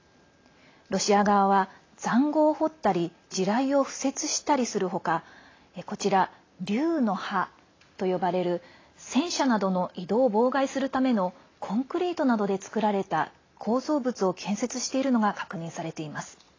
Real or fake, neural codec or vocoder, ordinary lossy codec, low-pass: real; none; AAC, 32 kbps; 7.2 kHz